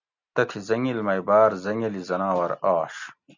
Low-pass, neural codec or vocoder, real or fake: 7.2 kHz; none; real